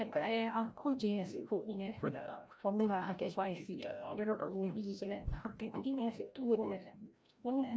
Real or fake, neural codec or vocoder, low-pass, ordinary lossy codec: fake; codec, 16 kHz, 0.5 kbps, FreqCodec, larger model; none; none